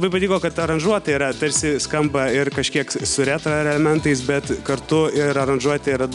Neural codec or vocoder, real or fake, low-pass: none; real; 10.8 kHz